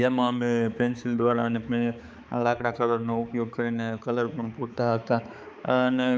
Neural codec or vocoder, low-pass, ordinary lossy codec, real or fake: codec, 16 kHz, 4 kbps, X-Codec, HuBERT features, trained on balanced general audio; none; none; fake